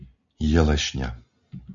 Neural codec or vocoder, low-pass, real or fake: none; 7.2 kHz; real